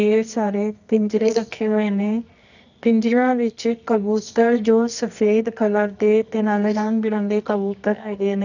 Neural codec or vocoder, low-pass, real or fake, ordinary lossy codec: codec, 24 kHz, 0.9 kbps, WavTokenizer, medium music audio release; 7.2 kHz; fake; none